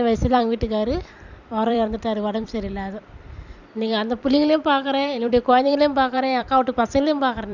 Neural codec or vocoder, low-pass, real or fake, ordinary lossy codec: vocoder, 44.1 kHz, 128 mel bands every 256 samples, BigVGAN v2; 7.2 kHz; fake; none